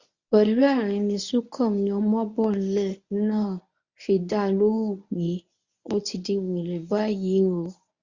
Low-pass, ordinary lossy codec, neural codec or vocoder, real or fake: 7.2 kHz; Opus, 64 kbps; codec, 24 kHz, 0.9 kbps, WavTokenizer, medium speech release version 1; fake